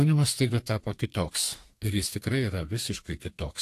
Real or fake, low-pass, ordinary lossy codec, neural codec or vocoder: fake; 14.4 kHz; AAC, 64 kbps; codec, 32 kHz, 1.9 kbps, SNAC